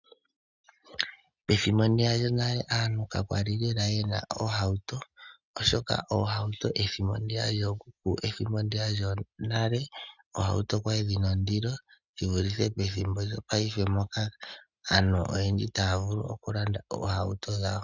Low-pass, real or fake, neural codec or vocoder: 7.2 kHz; real; none